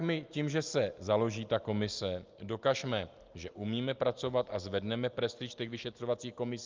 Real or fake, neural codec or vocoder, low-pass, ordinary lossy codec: real; none; 7.2 kHz; Opus, 24 kbps